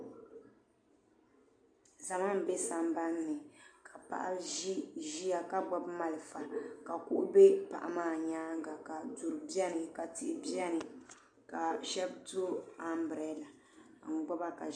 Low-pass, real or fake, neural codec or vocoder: 9.9 kHz; real; none